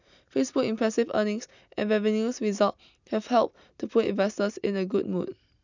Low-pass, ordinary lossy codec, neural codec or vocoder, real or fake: 7.2 kHz; none; none; real